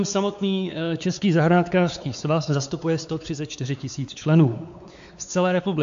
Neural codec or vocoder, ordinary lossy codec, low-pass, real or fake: codec, 16 kHz, 4 kbps, X-Codec, WavLM features, trained on Multilingual LibriSpeech; AAC, 64 kbps; 7.2 kHz; fake